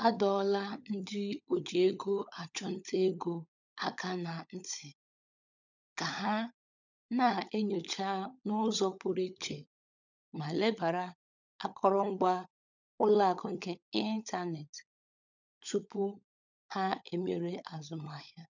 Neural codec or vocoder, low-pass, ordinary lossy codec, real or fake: codec, 16 kHz, 16 kbps, FunCodec, trained on LibriTTS, 50 frames a second; 7.2 kHz; none; fake